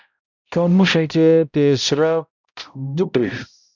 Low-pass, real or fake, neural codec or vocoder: 7.2 kHz; fake; codec, 16 kHz, 0.5 kbps, X-Codec, HuBERT features, trained on balanced general audio